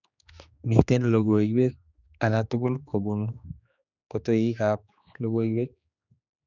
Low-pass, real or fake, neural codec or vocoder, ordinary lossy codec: 7.2 kHz; fake; codec, 16 kHz, 2 kbps, X-Codec, HuBERT features, trained on general audio; none